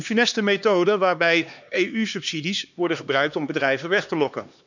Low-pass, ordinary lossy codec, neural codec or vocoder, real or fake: 7.2 kHz; none; codec, 16 kHz, 2 kbps, X-Codec, HuBERT features, trained on LibriSpeech; fake